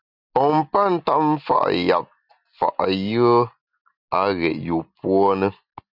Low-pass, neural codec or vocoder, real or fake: 5.4 kHz; none; real